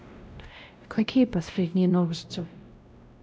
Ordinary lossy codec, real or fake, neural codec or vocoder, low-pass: none; fake; codec, 16 kHz, 0.5 kbps, X-Codec, WavLM features, trained on Multilingual LibriSpeech; none